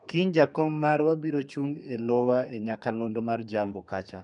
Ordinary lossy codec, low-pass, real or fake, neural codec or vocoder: none; 10.8 kHz; fake; codec, 32 kHz, 1.9 kbps, SNAC